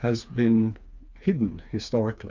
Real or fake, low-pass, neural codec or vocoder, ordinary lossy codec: fake; 7.2 kHz; codec, 16 kHz, 4 kbps, FreqCodec, smaller model; MP3, 64 kbps